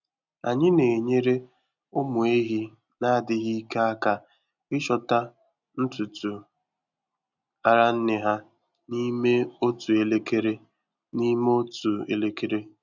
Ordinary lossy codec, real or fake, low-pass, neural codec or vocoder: none; real; 7.2 kHz; none